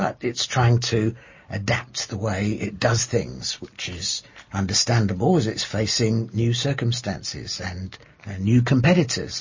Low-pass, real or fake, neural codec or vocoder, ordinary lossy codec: 7.2 kHz; real; none; MP3, 32 kbps